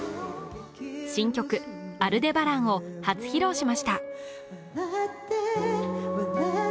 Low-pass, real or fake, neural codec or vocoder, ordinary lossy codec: none; real; none; none